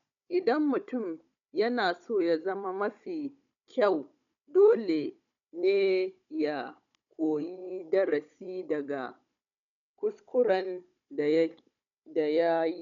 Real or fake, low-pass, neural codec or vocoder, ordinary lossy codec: fake; 7.2 kHz; codec, 16 kHz, 16 kbps, FunCodec, trained on Chinese and English, 50 frames a second; none